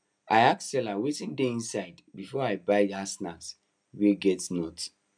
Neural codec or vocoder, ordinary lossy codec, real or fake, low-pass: none; none; real; 9.9 kHz